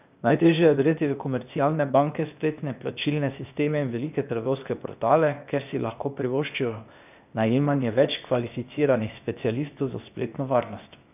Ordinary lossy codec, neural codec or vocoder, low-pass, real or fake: none; codec, 16 kHz, 0.8 kbps, ZipCodec; 3.6 kHz; fake